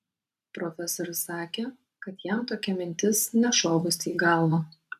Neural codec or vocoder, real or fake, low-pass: vocoder, 44.1 kHz, 128 mel bands every 512 samples, BigVGAN v2; fake; 14.4 kHz